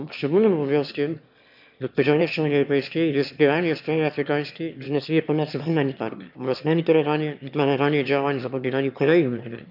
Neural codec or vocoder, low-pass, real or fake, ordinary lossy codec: autoencoder, 22.05 kHz, a latent of 192 numbers a frame, VITS, trained on one speaker; 5.4 kHz; fake; none